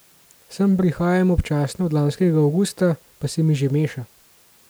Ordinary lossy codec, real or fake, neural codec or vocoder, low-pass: none; real; none; none